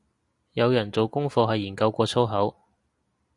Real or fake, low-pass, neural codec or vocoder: real; 10.8 kHz; none